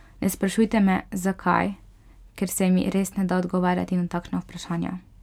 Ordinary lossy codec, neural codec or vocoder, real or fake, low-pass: none; none; real; 19.8 kHz